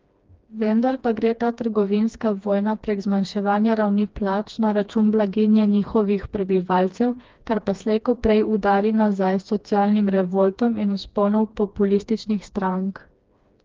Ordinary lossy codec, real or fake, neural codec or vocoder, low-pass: Opus, 32 kbps; fake; codec, 16 kHz, 2 kbps, FreqCodec, smaller model; 7.2 kHz